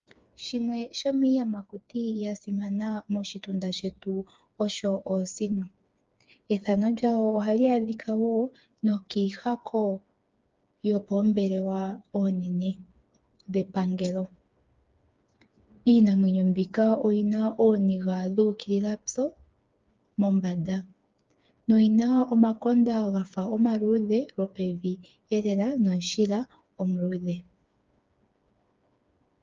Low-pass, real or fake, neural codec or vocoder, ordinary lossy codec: 7.2 kHz; fake; codec, 16 kHz, 4 kbps, FreqCodec, smaller model; Opus, 24 kbps